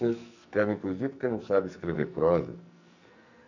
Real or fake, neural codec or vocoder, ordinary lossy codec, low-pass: fake; codec, 44.1 kHz, 2.6 kbps, SNAC; none; 7.2 kHz